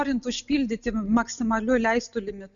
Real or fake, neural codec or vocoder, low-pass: real; none; 7.2 kHz